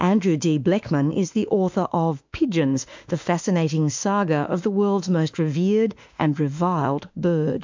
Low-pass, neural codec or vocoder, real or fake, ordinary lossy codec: 7.2 kHz; autoencoder, 48 kHz, 32 numbers a frame, DAC-VAE, trained on Japanese speech; fake; MP3, 64 kbps